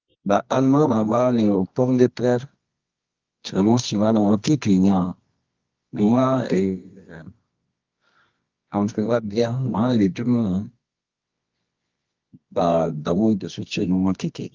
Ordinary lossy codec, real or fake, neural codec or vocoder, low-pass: Opus, 32 kbps; fake; codec, 24 kHz, 0.9 kbps, WavTokenizer, medium music audio release; 7.2 kHz